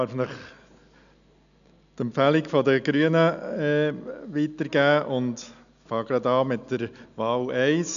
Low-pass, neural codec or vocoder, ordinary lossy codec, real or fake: 7.2 kHz; none; none; real